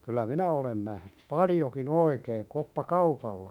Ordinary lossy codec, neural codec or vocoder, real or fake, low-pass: none; autoencoder, 48 kHz, 32 numbers a frame, DAC-VAE, trained on Japanese speech; fake; 19.8 kHz